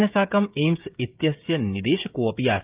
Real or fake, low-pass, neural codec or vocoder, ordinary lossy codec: fake; 3.6 kHz; codec, 16 kHz, 16 kbps, FreqCodec, smaller model; Opus, 24 kbps